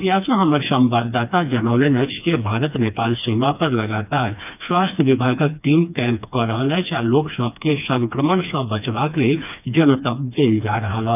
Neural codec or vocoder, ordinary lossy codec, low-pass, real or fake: codec, 16 kHz, 2 kbps, FreqCodec, smaller model; none; 3.6 kHz; fake